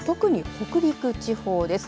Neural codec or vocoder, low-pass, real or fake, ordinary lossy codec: none; none; real; none